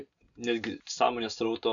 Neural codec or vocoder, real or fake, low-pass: none; real; 7.2 kHz